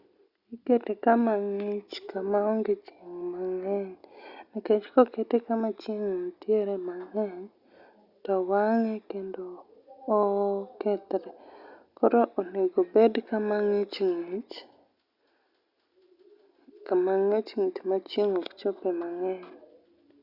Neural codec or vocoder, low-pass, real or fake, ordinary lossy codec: none; 5.4 kHz; real; Opus, 64 kbps